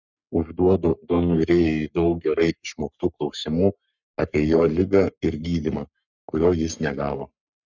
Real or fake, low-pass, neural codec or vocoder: fake; 7.2 kHz; codec, 44.1 kHz, 3.4 kbps, Pupu-Codec